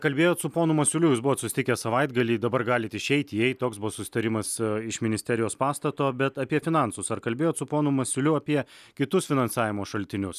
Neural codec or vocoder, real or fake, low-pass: none; real; 14.4 kHz